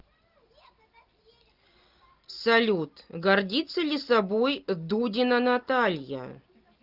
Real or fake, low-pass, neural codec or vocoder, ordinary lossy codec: real; 5.4 kHz; none; Opus, 24 kbps